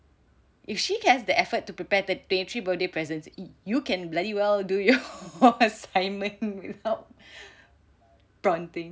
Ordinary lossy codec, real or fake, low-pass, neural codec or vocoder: none; real; none; none